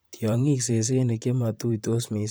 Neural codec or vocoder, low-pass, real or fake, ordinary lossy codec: vocoder, 44.1 kHz, 128 mel bands, Pupu-Vocoder; none; fake; none